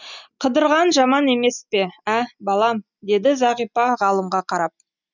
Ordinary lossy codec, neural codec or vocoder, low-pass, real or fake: none; none; 7.2 kHz; real